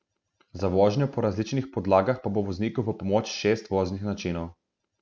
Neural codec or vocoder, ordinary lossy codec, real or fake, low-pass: none; none; real; none